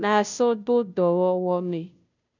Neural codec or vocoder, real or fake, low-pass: codec, 16 kHz, 0.5 kbps, FunCodec, trained on Chinese and English, 25 frames a second; fake; 7.2 kHz